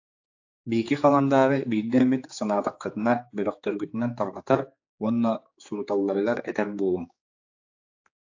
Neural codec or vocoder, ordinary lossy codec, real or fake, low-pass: codec, 16 kHz, 4 kbps, X-Codec, HuBERT features, trained on general audio; AAC, 48 kbps; fake; 7.2 kHz